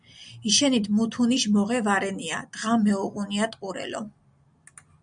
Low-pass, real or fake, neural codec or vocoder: 9.9 kHz; real; none